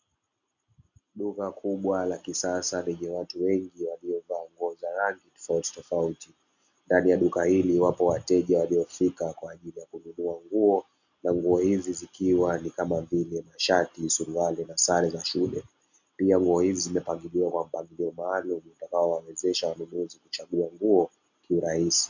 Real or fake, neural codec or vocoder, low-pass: real; none; 7.2 kHz